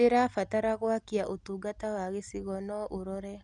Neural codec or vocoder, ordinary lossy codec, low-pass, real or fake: none; none; 10.8 kHz; real